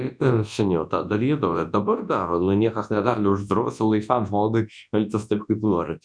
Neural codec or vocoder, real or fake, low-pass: codec, 24 kHz, 0.9 kbps, WavTokenizer, large speech release; fake; 9.9 kHz